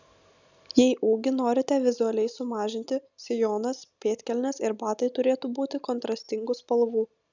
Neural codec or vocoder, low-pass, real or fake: none; 7.2 kHz; real